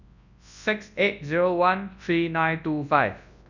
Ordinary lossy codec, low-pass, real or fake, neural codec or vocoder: none; 7.2 kHz; fake; codec, 24 kHz, 0.9 kbps, WavTokenizer, large speech release